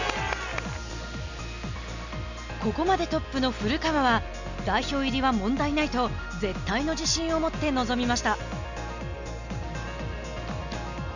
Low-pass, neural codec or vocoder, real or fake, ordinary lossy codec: 7.2 kHz; none; real; none